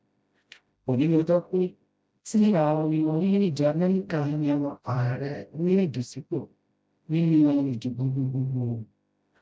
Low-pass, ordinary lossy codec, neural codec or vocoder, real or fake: none; none; codec, 16 kHz, 0.5 kbps, FreqCodec, smaller model; fake